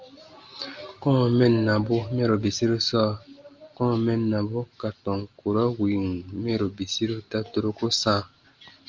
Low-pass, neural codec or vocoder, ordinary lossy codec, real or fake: 7.2 kHz; none; Opus, 32 kbps; real